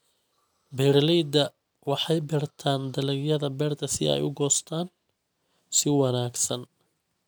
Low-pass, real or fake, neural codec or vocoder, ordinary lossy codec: none; real; none; none